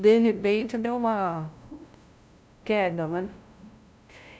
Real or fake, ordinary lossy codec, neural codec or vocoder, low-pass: fake; none; codec, 16 kHz, 0.5 kbps, FunCodec, trained on LibriTTS, 25 frames a second; none